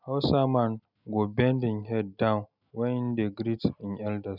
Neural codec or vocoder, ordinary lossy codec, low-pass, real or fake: none; none; 5.4 kHz; real